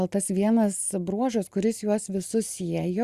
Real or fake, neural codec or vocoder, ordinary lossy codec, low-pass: real; none; Opus, 64 kbps; 14.4 kHz